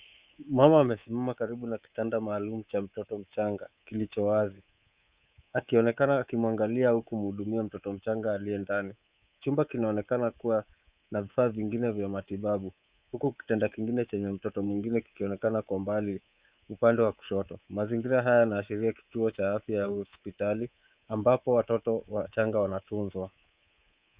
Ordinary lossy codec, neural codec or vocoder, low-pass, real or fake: Opus, 64 kbps; codec, 24 kHz, 3.1 kbps, DualCodec; 3.6 kHz; fake